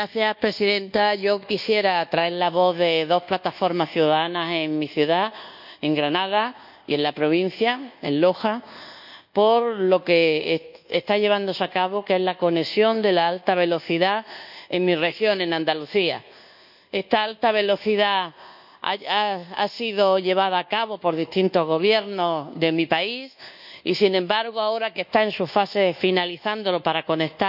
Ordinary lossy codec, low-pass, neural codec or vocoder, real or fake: none; 5.4 kHz; codec, 24 kHz, 1.2 kbps, DualCodec; fake